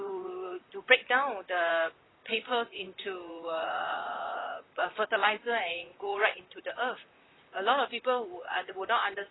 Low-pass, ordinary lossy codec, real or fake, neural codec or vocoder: 7.2 kHz; AAC, 16 kbps; fake; vocoder, 44.1 kHz, 128 mel bands, Pupu-Vocoder